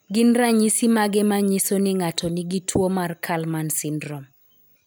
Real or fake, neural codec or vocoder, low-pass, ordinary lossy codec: real; none; none; none